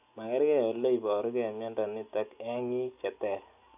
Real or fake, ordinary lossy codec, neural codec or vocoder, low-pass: real; none; none; 3.6 kHz